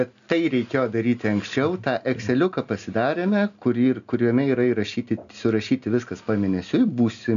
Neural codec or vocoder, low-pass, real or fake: none; 7.2 kHz; real